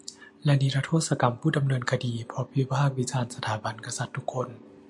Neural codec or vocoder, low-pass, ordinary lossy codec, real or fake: none; 10.8 kHz; MP3, 64 kbps; real